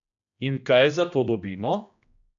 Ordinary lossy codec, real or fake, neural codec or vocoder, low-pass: AAC, 64 kbps; fake; codec, 16 kHz, 1 kbps, X-Codec, HuBERT features, trained on general audio; 7.2 kHz